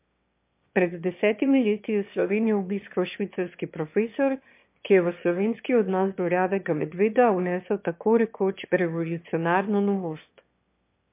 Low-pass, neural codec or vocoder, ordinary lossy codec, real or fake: 3.6 kHz; autoencoder, 22.05 kHz, a latent of 192 numbers a frame, VITS, trained on one speaker; MP3, 32 kbps; fake